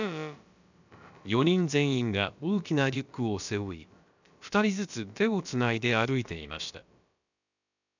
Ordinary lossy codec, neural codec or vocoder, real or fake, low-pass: none; codec, 16 kHz, about 1 kbps, DyCAST, with the encoder's durations; fake; 7.2 kHz